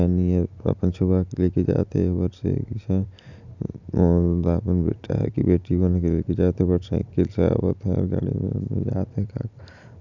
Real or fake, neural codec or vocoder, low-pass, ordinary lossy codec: real; none; 7.2 kHz; none